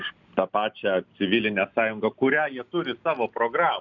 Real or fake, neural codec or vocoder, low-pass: real; none; 7.2 kHz